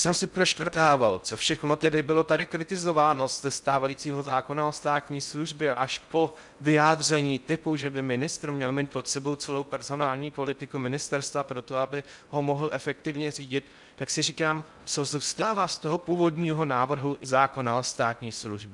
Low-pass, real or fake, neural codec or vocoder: 10.8 kHz; fake; codec, 16 kHz in and 24 kHz out, 0.6 kbps, FocalCodec, streaming, 4096 codes